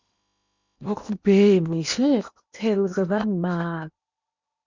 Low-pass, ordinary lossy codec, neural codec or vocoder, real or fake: 7.2 kHz; Opus, 64 kbps; codec, 16 kHz in and 24 kHz out, 0.8 kbps, FocalCodec, streaming, 65536 codes; fake